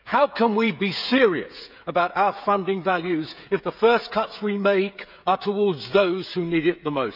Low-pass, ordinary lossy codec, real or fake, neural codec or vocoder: 5.4 kHz; none; fake; codec, 16 kHz, 16 kbps, FreqCodec, smaller model